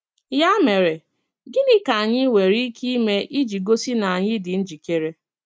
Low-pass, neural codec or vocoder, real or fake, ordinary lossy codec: none; none; real; none